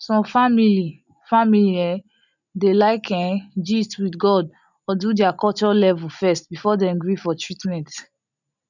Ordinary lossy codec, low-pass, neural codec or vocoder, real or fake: none; 7.2 kHz; none; real